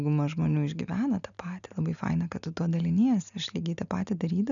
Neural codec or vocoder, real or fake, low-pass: none; real; 7.2 kHz